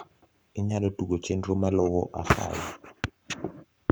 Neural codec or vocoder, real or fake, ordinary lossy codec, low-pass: codec, 44.1 kHz, 7.8 kbps, Pupu-Codec; fake; none; none